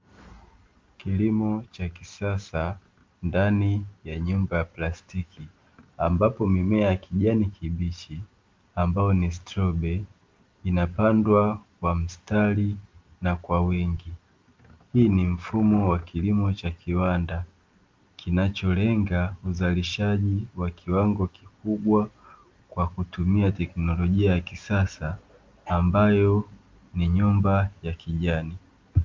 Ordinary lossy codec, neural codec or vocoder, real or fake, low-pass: Opus, 24 kbps; none; real; 7.2 kHz